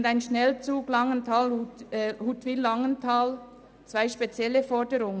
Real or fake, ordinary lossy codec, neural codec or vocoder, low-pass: real; none; none; none